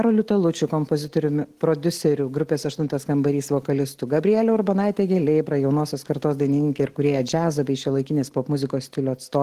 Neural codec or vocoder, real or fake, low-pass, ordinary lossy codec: none; real; 14.4 kHz; Opus, 16 kbps